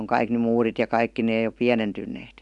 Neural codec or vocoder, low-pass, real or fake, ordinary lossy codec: none; 10.8 kHz; real; none